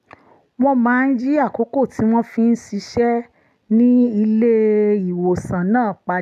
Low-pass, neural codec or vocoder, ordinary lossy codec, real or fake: 14.4 kHz; none; AAC, 96 kbps; real